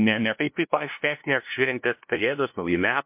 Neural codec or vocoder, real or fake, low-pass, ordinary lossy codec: codec, 16 kHz, 0.5 kbps, FunCodec, trained on LibriTTS, 25 frames a second; fake; 3.6 kHz; MP3, 32 kbps